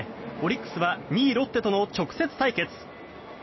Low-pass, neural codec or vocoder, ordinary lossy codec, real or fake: 7.2 kHz; none; MP3, 24 kbps; real